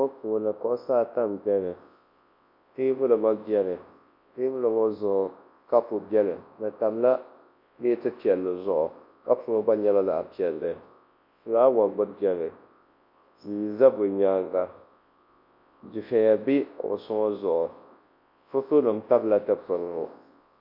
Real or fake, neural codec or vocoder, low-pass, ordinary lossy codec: fake; codec, 24 kHz, 0.9 kbps, WavTokenizer, large speech release; 5.4 kHz; MP3, 32 kbps